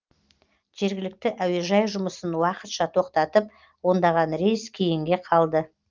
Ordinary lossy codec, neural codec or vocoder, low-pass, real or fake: Opus, 24 kbps; none; 7.2 kHz; real